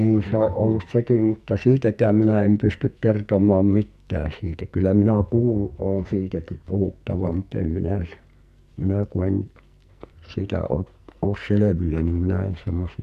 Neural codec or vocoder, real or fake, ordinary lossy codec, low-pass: codec, 44.1 kHz, 2.6 kbps, SNAC; fake; none; 14.4 kHz